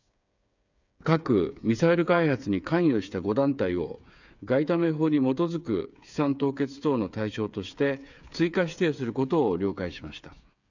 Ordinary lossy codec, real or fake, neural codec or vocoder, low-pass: none; fake; codec, 16 kHz, 8 kbps, FreqCodec, smaller model; 7.2 kHz